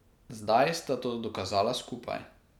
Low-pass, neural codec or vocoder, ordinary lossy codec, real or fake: 19.8 kHz; none; none; real